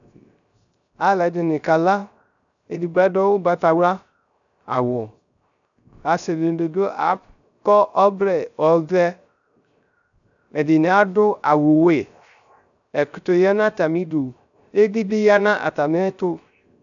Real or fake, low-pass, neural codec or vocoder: fake; 7.2 kHz; codec, 16 kHz, 0.3 kbps, FocalCodec